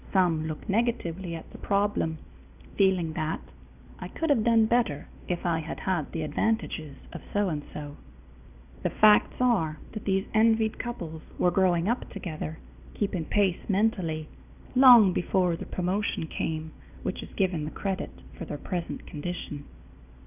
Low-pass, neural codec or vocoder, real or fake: 3.6 kHz; none; real